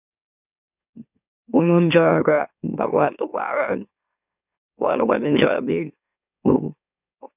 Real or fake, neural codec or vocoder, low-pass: fake; autoencoder, 44.1 kHz, a latent of 192 numbers a frame, MeloTTS; 3.6 kHz